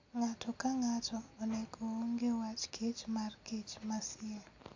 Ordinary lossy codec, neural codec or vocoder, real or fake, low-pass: AAC, 48 kbps; none; real; 7.2 kHz